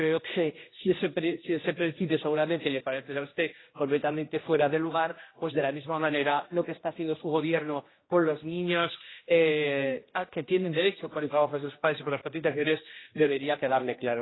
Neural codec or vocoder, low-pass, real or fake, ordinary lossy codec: codec, 16 kHz, 1 kbps, X-Codec, HuBERT features, trained on general audio; 7.2 kHz; fake; AAC, 16 kbps